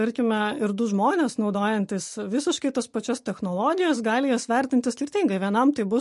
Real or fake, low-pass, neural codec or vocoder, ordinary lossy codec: real; 14.4 kHz; none; MP3, 48 kbps